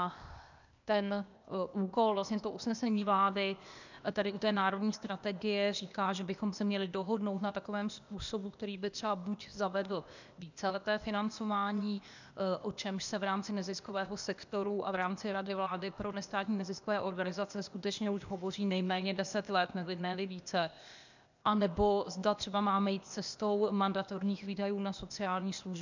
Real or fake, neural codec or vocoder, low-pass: fake; codec, 16 kHz, 0.8 kbps, ZipCodec; 7.2 kHz